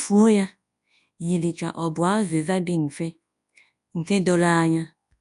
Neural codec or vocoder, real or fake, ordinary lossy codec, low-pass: codec, 24 kHz, 0.9 kbps, WavTokenizer, large speech release; fake; AAC, 96 kbps; 10.8 kHz